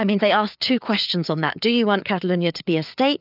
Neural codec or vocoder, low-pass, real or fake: codec, 16 kHz, 4 kbps, FreqCodec, larger model; 5.4 kHz; fake